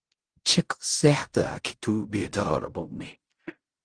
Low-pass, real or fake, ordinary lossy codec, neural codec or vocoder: 9.9 kHz; fake; Opus, 24 kbps; codec, 16 kHz in and 24 kHz out, 0.4 kbps, LongCat-Audio-Codec, fine tuned four codebook decoder